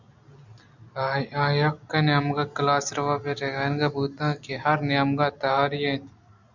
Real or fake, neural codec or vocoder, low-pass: real; none; 7.2 kHz